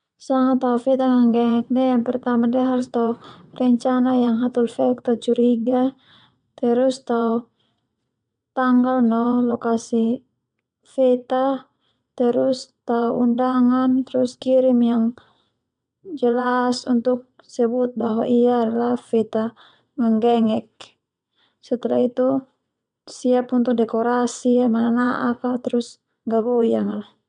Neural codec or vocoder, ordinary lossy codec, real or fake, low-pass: vocoder, 22.05 kHz, 80 mel bands, WaveNeXt; none; fake; 9.9 kHz